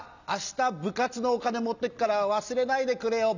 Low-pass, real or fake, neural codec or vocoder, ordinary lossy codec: 7.2 kHz; real; none; none